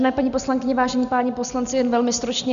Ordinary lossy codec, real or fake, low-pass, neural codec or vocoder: AAC, 96 kbps; real; 7.2 kHz; none